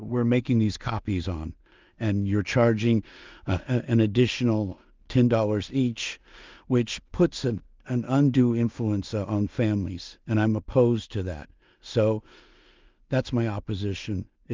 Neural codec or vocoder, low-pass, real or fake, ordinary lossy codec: codec, 16 kHz in and 24 kHz out, 0.4 kbps, LongCat-Audio-Codec, two codebook decoder; 7.2 kHz; fake; Opus, 24 kbps